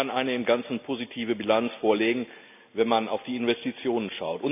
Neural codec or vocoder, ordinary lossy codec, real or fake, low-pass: none; none; real; 3.6 kHz